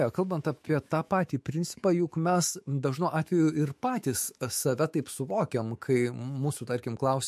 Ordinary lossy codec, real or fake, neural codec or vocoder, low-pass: MP3, 64 kbps; fake; autoencoder, 48 kHz, 128 numbers a frame, DAC-VAE, trained on Japanese speech; 14.4 kHz